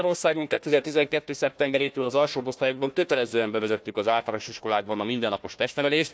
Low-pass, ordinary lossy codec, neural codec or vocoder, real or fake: none; none; codec, 16 kHz, 1 kbps, FunCodec, trained on Chinese and English, 50 frames a second; fake